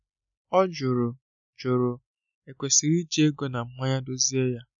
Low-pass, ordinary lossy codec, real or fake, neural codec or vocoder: 7.2 kHz; none; real; none